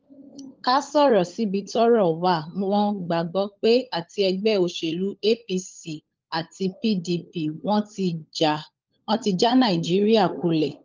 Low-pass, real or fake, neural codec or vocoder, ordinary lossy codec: 7.2 kHz; fake; codec, 16 kHz, 16 kbps, FunCodec, trained on LibriTTS, 50 frames a second; Opus, 32 kbps